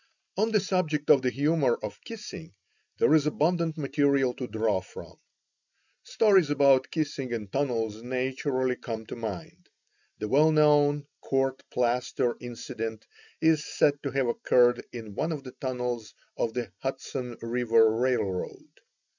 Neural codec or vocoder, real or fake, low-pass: none; real; 7.2 kHz